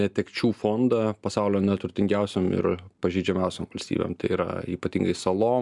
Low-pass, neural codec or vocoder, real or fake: 10.8 kHz; none; real